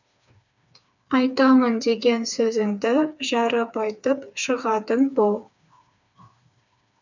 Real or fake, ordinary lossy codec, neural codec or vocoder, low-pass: fake; none; codec, 16 kHz, 4 kbps, FreqCodec, smaller model; 7.2 kHz